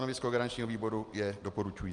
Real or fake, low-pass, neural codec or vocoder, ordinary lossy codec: real; 10.8 kHz; none; Opus, 32 kbps